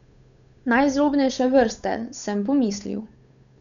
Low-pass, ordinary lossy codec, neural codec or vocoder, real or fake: 7.2 kHz; none; codec, 16 kHz, 8 kbps, FunCodec, trained on Chinese and English, 25 frames a second; fake